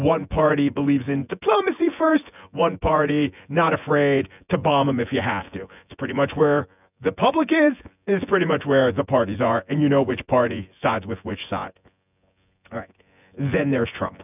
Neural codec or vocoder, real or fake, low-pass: vocoder, 24 kHz, 100 mel bands, Vocos; fake; 3.6 kHz